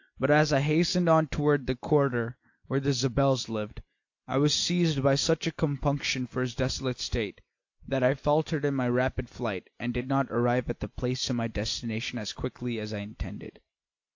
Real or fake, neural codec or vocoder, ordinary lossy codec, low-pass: real; none; AAC, 48 kbps; 7.2 kHz